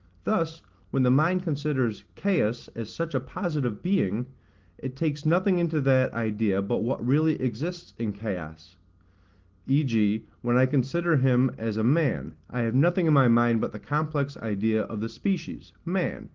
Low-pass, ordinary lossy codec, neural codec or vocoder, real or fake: 7.2 kHz; Opus, 16 kbps; none; real